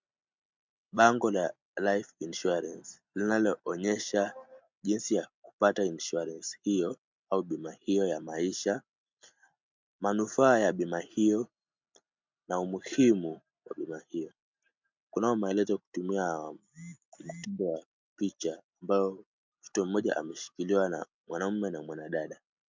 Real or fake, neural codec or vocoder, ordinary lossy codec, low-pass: real; none; MP3, 64 kbps; 7.2 kHz